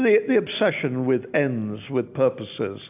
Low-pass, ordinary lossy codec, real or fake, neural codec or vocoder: 3.6 kHz; AAC, 32 kbps; real; none